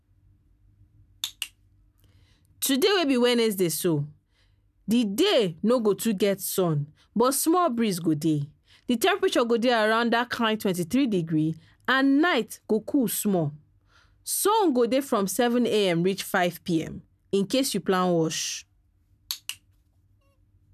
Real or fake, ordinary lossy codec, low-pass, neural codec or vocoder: real; none; 14.4 kHz; none